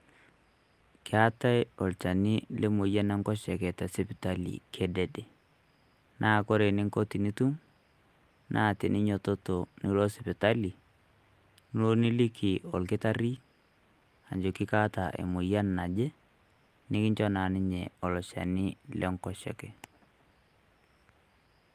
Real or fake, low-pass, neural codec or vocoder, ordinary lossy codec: real; 14.4 kHz; none; Opus, 32 kbps